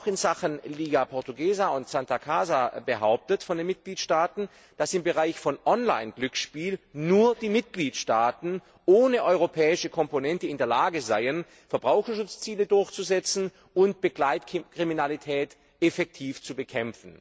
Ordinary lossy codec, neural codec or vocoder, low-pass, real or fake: none; none; none; real